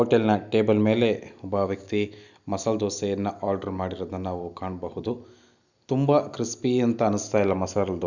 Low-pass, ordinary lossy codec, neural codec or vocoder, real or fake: 7.2 kHz; none; none; real